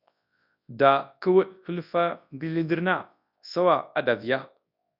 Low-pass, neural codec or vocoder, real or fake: 5.4 kHz; codec, 24 kHz, 0.9 kbps, WavTokenizer, large speech release; fake